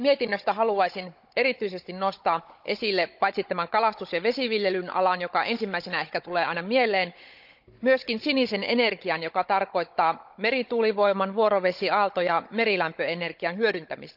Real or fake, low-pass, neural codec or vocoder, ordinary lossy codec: fake; 5.4 kHz; codec, 16 kHz, 16 kbps, FunCodec, trained on Chinese and English, 50 frames a second; none